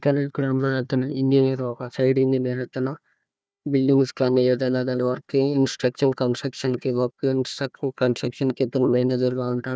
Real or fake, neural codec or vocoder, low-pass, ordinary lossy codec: fake; codec, 16 kHz, 1 kbps, FunCodec, trained on Chinese and English, 50 frames a second; none; none